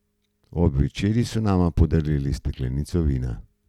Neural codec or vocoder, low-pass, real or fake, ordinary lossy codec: none; 19.8 kHz; real; none